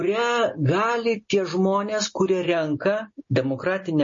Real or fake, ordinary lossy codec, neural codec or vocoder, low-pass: real; MP3, 32 kbps; none; 7.2 kHz